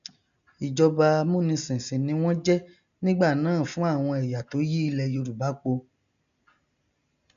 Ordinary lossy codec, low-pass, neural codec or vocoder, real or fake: none; 7.2 kHz; none; real